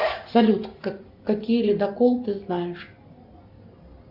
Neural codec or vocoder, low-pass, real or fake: none; 5.4 kHz; real